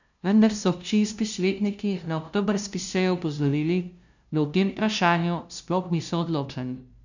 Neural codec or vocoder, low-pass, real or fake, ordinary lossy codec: codec, 16 kHz, 0.5 kbps, FunCodec, trained on LibriTTS, 25 frames a second; 7.2 kHz; fake; none